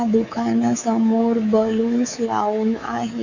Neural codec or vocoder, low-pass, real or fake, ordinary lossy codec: codec, 24 kHz, 3.1 kbps, DualCodec; 7.2 kHz; fake; none